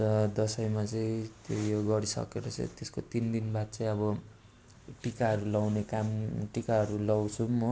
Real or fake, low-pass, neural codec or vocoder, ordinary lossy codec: real; none; none; none